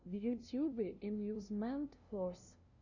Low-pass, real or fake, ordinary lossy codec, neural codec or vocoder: 7.2 kHz; fake; Opus, 64 kbps; codec, 16 kHz, 0.5 kbps, FunCodec, trained on LibriTTS, 25 frames a second